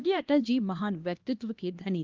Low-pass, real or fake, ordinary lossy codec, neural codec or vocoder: 7.2 kHz; fake; Opus, 32 kbps; codec, 16 kHz, 1 kbps, X-Codec, HuBERT features, trained on LibriSpeech